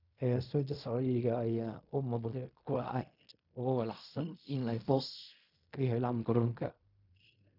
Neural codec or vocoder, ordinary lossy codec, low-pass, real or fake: codec, 16 kHz in and 24 kHz out, 0.4 kbps, LongCat-Audio-Codec, fine tuned four codebook decoder; none; 5.4 kHz; fake